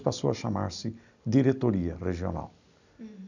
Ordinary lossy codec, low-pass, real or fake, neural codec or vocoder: none; 7.2 kHz; real; none